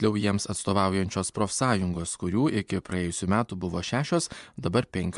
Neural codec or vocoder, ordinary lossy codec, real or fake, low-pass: vocoder, 24 kHz, 100 mel bands, Vocos; MP3, 96 kbps; fake; 10.8 kHz